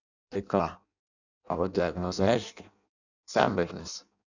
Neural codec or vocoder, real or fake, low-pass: codec, 16 kHz in and 24 kHz out, 0.6 kbps, FireRedTTS-2 codec; fake; 7.2 kHz